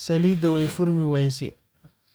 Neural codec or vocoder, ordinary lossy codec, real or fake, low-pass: codec, 44.1 kHz, 2.6 kbps, DAC; none; fake; none